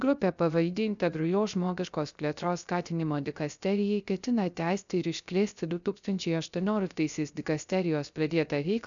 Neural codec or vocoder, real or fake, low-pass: codec, 16 kHz, 0.3 kbps, FocalCodec; fake; 7.2 kHz